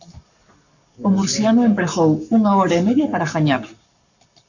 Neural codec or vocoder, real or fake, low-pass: codec, 44.1 kHz, 7.8 kbps, Pupu-Codec; fake; 7.2 kHz